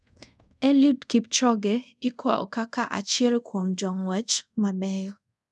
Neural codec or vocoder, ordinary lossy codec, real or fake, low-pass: codec, 24 kHz, 0.5 kbps, DualCodec; none; fake; none